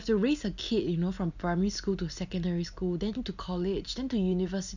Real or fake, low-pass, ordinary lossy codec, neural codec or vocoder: real; 7.2 kHz; none; none